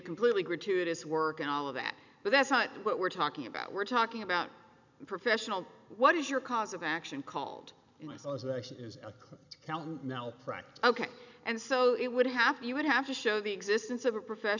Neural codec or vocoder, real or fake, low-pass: none; real; 7.2 kHz